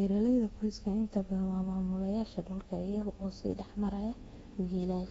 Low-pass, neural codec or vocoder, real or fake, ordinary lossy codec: 10.8 kHz; codec, 24 kHz, 1.2 kbps, DualCodec; fake; AAC, 24 kbps